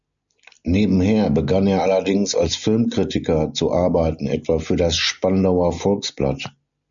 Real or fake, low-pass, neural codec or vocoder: real; 7.2 kHz; none